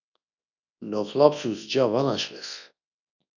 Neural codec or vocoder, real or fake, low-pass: codec, 24 kHz, 0.9 kbps, WavTokenizer, large speech release; fake; 7.2 kHz